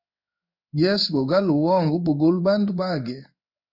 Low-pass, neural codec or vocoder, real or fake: 5.4 kHz; codec, 16 kHz in and 24 kHz out, 1 kbps, XY-Tokenizer; fake